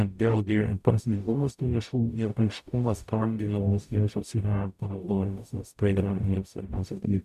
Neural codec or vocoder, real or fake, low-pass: codec, 44.1 kHz, 0.9 kbps, DAC; fake; 14.4 kHz